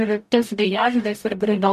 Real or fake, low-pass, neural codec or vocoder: fake; 14.4 kHz; codec, 44.1 kHz, 0.9 kbps, DAC